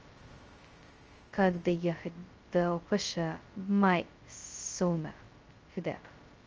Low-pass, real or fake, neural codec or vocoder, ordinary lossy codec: 7.2 kHz; fake; codec, 16 kHz, 0.2 kbps, FocalCodec; Opus, 24 kbps